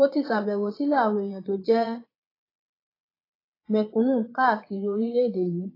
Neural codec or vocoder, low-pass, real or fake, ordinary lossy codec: vocoder, 22.05 kHz, 80 mel bands, Vocos; 5.4 kHz; fake; AAC, 24 kbps